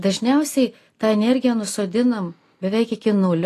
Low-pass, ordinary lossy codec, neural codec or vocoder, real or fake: 14.4 kHz; AAC, 48 kbps; none; real